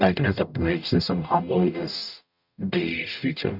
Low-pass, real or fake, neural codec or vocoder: 5.4 kHz; fake; codec, 44.1 kHz, 0.9 kbps, DAC